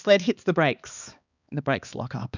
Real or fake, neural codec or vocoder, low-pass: fake; codec, 16 kHz, 2 kbps, X-Codec, HuBERT features, trained on balanced general audio; 7.2 kHz